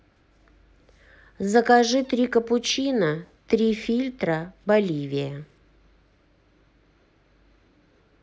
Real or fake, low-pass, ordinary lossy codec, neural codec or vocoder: real; none; none; none